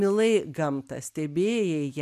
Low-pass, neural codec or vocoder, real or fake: 14.4 kHz; none; real